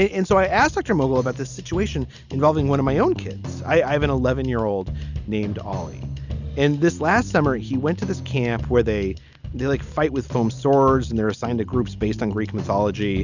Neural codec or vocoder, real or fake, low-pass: none; real; 7.2 kHz